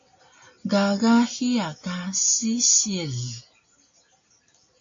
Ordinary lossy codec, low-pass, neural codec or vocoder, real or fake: AAC, 48 kbps; 7.2 kHz; none; real